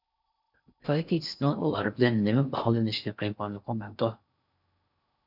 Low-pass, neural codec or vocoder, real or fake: 5.4 kHz; codec, 16 kHz in and 24 kHz out, 0.6 kbps, FocalCodec, streaming, 4096 codes; fake